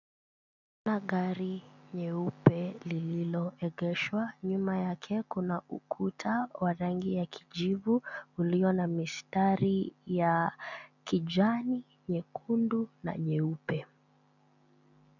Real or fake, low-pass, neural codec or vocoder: real; 7.2 kHz; none